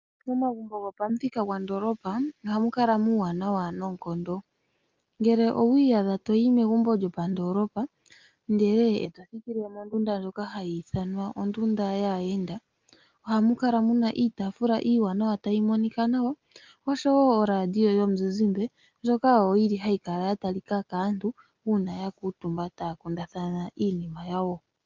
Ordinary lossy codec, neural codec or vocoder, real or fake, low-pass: Opus, 32 kbps; none; real; 7.2 kHz